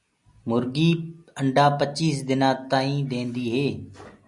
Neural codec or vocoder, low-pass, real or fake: none; 10.8 kHz; real